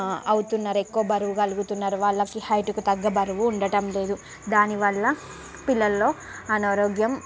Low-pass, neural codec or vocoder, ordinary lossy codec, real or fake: none; none; none; real